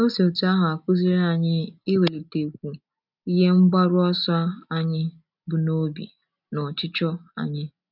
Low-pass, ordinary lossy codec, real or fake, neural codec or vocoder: 5.4 kHz; none; real; none